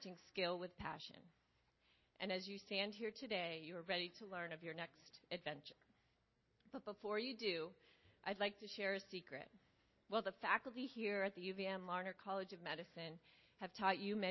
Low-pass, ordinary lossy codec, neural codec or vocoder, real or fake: 7.2 kHz; MP3, 24 kbps; none; real